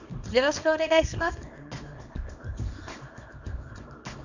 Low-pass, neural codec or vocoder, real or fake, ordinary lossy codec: 7.2 kHz; codec, 24 kHz, 0.9 kbps, WavTokenizer, small release; fake; none